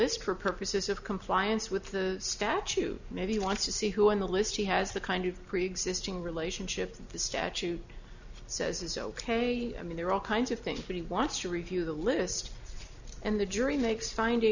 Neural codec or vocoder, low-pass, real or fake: none; 7.2 kHz; real